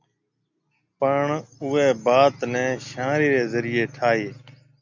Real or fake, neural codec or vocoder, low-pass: real; none; 7.2 kHz